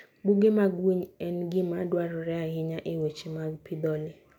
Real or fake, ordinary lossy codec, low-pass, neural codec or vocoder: real; none; 19.8 kHz; none